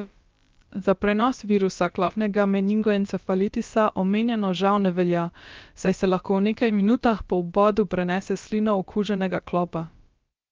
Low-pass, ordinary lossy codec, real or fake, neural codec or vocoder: 7.2 kHz; Opus, 24 kbps; fake; codec, 16 kHz, about 1 kbps, DyCAST, with the encoder's durations